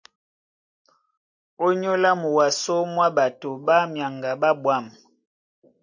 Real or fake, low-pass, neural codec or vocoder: real; 7.2 kHz; none